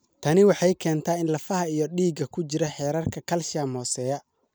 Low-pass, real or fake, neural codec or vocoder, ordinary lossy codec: none; real; none; none